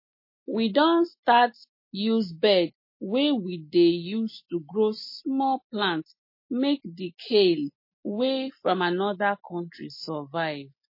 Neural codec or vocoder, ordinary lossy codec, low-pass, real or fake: none; MP3, 24 kbps; 5.4 kHz; real